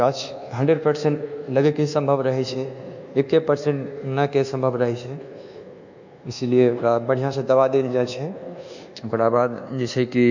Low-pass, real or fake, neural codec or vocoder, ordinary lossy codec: 7.2 kHz; fake; codec, 24 kHz, 1.2 kbps, DualCodec; none